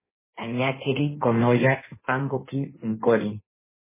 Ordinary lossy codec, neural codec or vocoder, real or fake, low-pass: MP3, 16 kbps; codec, 16 kHz in and 24 kHz out, 1.1 kbps, FireRedTTS-2 codec; fake; 3.6 kHz